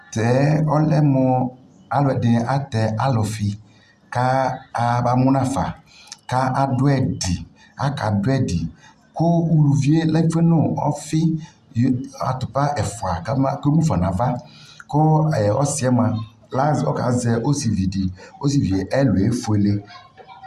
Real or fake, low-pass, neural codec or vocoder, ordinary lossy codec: real; 14.4 kHz; none; Opus, 64 kbps